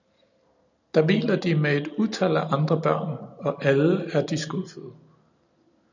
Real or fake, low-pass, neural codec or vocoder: real; 7.2 kHz; none